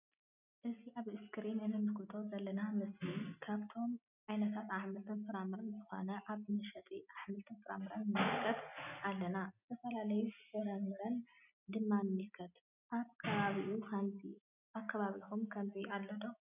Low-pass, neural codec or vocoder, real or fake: 3.6 kHz; none; real